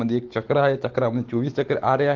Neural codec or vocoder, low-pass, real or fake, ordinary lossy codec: none; 7.2 kHz; real; Opus, 16 kbps